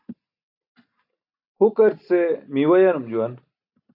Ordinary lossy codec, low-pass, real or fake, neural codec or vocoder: AAC, 48 kbps; 5.4 kHz; real; none